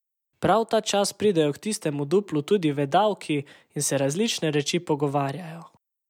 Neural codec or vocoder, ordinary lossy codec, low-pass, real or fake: none; none; 19.8 kHz; real